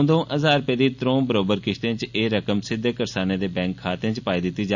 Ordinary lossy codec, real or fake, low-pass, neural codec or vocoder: none; real; 7.2 kHz; none